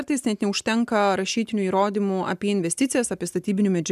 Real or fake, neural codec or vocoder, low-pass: real; none; 14.4 kHz